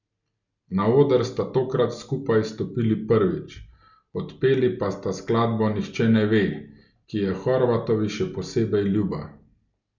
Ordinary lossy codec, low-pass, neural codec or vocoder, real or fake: none; 7.2 kHz; none; real